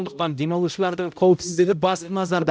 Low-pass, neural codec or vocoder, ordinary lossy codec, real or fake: none; codec, 16 kHz, 0.5 kbps, X-Codec, HuBERT features, trained on balanced general audio; none; fake